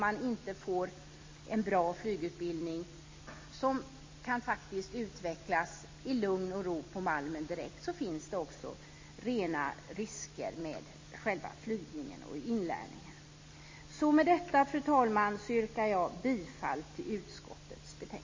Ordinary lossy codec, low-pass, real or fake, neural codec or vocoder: MP3, 32 kbps; 7.2 kHz; real; none